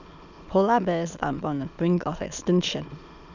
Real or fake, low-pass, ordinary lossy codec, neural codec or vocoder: fake; 7.2 kHz; none; autoencoder, 22.05 kHz, a latent of 192 numbers a frame, VITS, trained on many speakers